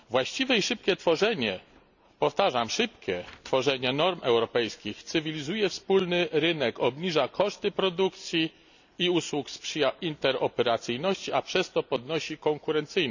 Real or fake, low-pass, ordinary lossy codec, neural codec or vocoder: real; 7.2 kHz; none; none